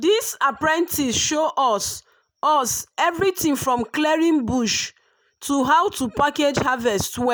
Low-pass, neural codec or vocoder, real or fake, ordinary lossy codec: none; none; real; none